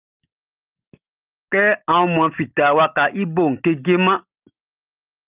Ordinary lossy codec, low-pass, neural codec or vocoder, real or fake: Opus, 32 kbps; 3.6 kHz; none; real